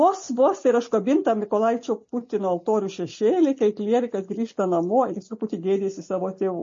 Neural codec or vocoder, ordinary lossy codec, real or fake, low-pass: none; MP3, 32 kbps; real; 7.2 kHz